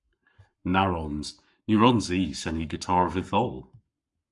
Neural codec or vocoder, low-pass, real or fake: codec, 44.1 kHz, 7.8 kbps, Pupu-Codec; 10.8 kHz; fake